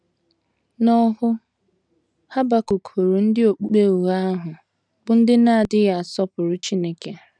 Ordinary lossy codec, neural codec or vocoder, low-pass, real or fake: none; none; 9.9 kHz; real